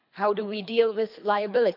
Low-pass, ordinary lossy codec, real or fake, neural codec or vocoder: 5.4 kHz; none; fake; codec, 24 kHz, 3 kbps, HILCodec